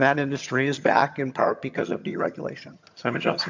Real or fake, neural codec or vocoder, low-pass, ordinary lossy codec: fake; vocoder, 22.05 kHz, 80 mel bands, HiFi-GAN; 7.2 kHz; MP3, 64 kbps